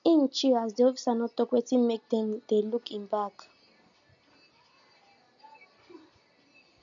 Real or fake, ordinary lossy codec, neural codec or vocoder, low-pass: real; none; none; 7.2 kHz